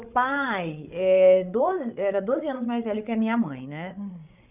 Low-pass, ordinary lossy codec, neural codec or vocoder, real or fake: 3.6 kHz; none; codec, 16 kHz, 8 kbps, FreqCodec, larger model; fake